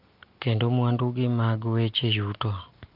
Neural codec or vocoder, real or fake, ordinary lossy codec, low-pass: none; real; Opus, 32 kbps; 5.4 kHz